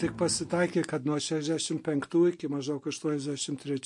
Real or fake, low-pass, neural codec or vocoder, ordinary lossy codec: real; 10.8 kHz; none; MP3, 48 kbps